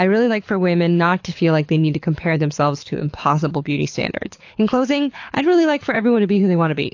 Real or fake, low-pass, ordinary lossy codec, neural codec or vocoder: fake; 7.2 kHz; AAC, 48 kbps; codec, 24 kHz, 6 kbps, HILCodec